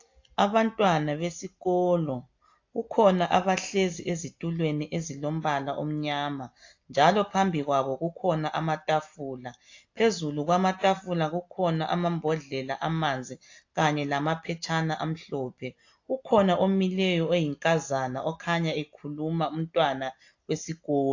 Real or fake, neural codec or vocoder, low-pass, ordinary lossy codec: real; none; 7.2 kHz; AAC, 48 kbps